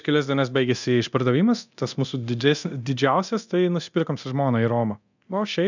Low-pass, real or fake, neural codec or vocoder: 7.2 kHz; fake; codec, 24 kHz, 0.9 kbps, DualCodec